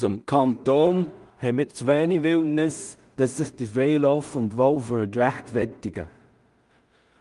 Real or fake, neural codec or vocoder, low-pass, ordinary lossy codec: fake; codec, 16 kHz in and 24 kHz out, 0.4 kbps, LongCat-Audio-Codec, two codebook decoder; 10.8 kHz; Opus, 32 kbps